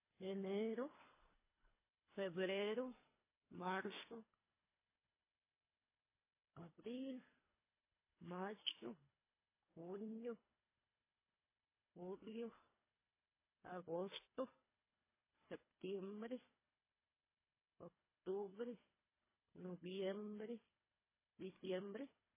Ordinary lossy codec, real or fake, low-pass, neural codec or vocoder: MP3, 16 kbps; fake; 3.6 kHz; codec, 24 kHz, 3 kbps, HILCodec